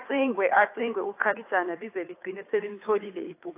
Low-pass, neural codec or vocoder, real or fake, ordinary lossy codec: 3.6 kHz; codec, 16 kHz, 2 kbps, FunCodec, trained on Chinese and English, 25 frames a second; fake; AAC, 24 kbps